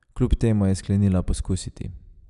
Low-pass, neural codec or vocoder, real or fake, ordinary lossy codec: 10.8 kHz; none; real; none